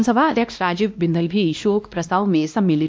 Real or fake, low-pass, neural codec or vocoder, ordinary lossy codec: fake; none; codec, 16 kHz, 1 kbps, X-Codec, WavLM features, trained on Multilingual LibriSpeech; none